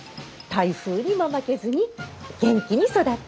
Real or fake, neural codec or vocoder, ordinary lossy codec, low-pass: real; none; none; none